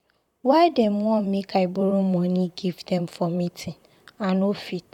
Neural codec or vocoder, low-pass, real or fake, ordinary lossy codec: vocoder, 44.1 kHz, 128 mel bands, Pupu-Vocoder; 19.8 kHz; fake; none